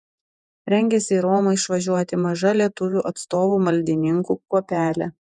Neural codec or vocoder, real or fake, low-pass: vocoder, 48 kHz, 128 mel bands, Vocos; fake; 10.8 kHz